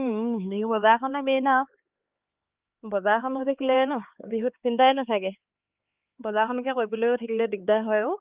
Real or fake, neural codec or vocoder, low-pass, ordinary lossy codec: fake; codec, 16 kHz, 4 kbps, X-Codec, HuBERT features, trained on LibriSpeech; 3.6 kHz; Opus, 32 kbps